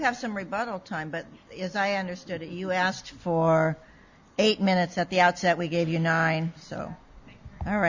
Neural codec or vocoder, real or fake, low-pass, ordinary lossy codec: none; real; 7.2 kHz; Opus, 64 kbps